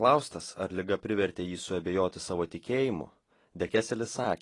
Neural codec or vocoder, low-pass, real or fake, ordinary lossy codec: vocoder, 44.1 kHz, 128 mel bands every 256 samples, BigVGAN v2; 10.8 kHz; fake; AAC, 32 kbps